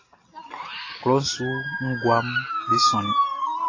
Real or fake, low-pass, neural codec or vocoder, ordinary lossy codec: real; 7.2 kHz; none; AAC, 32 kbps